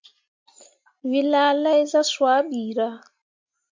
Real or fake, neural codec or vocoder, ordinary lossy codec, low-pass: real; none; MP3, 64 kbps; 7.2 kHz